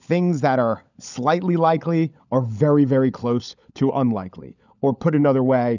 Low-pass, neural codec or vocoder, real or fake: 7.2 kHz; codec, 16 kHz, 16 kbps, FunCodec, trained on LibriTTS, 50 frames a second; fake